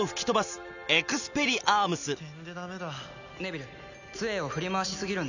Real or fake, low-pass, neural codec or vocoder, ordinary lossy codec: real; 7.2 kHz; none; none